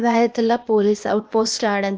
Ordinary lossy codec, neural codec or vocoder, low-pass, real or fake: none; codec, 16 kHz, 0.8 kbps, ZipCodec; none; fake